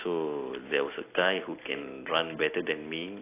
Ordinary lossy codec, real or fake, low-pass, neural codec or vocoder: AAC, 24 kbps; real; 3.6 kHz; none